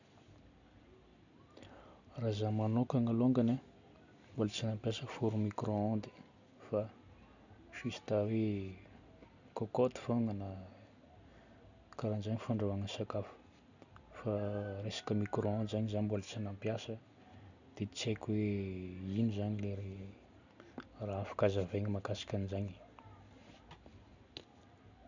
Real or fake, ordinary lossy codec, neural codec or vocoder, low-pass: real; none; none; 7.2 kHz